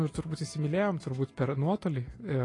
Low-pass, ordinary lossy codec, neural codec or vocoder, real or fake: 10.8 kHz; AAC, 32 kbps; none; real